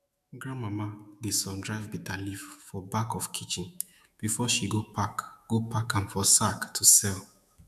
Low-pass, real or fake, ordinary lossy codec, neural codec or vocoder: 14.4 kHz; fake; none; autoencoder, 48 kHz, 128 numbers a frame, DAC-VAE, trained on Japanese speech